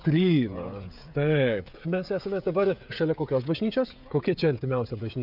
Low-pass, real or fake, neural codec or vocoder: 5.4 kHz; fake; codec, 16 kHz, 16 kbps, FreqCodec, smaller model